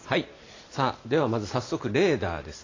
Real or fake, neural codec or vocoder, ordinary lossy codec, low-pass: real; none; AAC, 32 kbps; 7.2 kHz